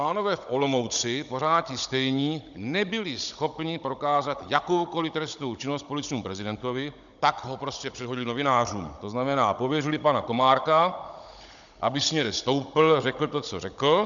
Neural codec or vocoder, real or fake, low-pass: codec, 16 kHz, 16 kbps, FunCodec, trained on Chinese and English, 50 frames a second; fake; 7.2 kHz